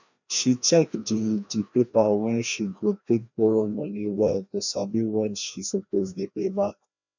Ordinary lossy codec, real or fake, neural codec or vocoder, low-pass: none; fake; codec, 16 kHz, 1 kbps, FreqCodec, larger model; 7.2 kHz